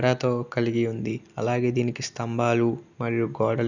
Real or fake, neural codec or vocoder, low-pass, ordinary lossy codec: real; none; 7.2 kHz; none